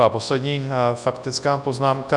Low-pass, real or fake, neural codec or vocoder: 10.8 kHz; fake; codec, 24 kHz, 0.9 kbps, WavTokenizer, large speech release